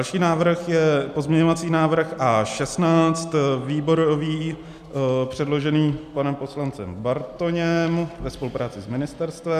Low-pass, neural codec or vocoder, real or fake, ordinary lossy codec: 14.4 kHz; none; real; MP3, 96 kbps